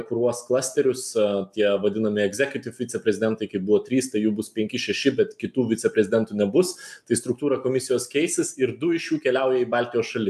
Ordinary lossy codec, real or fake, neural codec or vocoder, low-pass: AAC, 96 kbps; real; none; 14.4 kHz